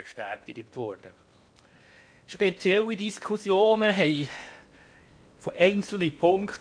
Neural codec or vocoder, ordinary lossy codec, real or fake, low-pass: codec, 16 kHz in and 24 kHz out, 0.8 kbps, FocalCodec, streaming, 65536 codes; MP3, 64 kbps; fake; 9.9 kHz